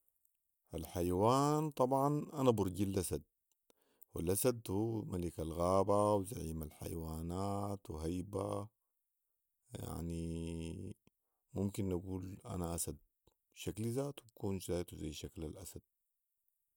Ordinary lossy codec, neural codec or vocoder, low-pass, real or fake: none; none; none; real